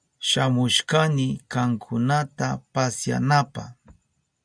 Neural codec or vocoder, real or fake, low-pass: none; real; 9.9 kHz